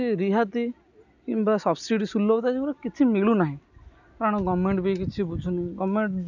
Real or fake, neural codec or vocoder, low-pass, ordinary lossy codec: real; none; 7.2 kHz; none